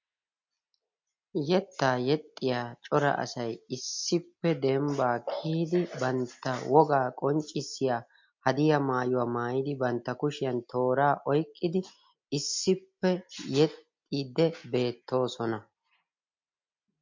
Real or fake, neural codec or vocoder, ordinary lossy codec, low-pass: real; none; MP3, 48 kbps; 7.2 kHz